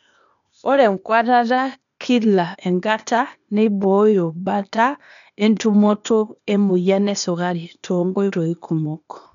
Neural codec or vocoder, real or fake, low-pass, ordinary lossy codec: codec, 16 kHz, 0.8 kbps, ZipCodec; fake; 7.2 kHz; none